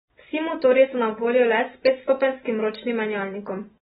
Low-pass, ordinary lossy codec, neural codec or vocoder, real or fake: 19.8 kHz; AAC, 16 kbps; none; real